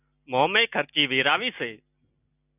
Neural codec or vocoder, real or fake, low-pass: none; real; 3.6 kHz